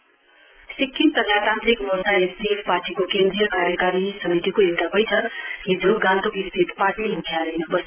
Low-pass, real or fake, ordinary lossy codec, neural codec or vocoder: 3.6 kHz; real; Opus, 24 kbps; none